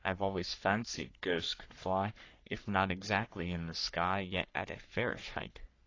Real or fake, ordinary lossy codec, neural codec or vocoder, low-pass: fake; AAC, 32 kbps; codec, 44.1 kHz, 3.4 kbps, Pupu-Codec; 7.2 kHz